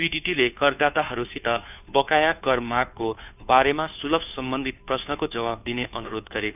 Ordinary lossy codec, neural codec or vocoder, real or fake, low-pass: none; codec, 16 kHz, 2 kbps, FunCodec, trained on Chinese and English, 25 frames a second; fake; 3.6 kHz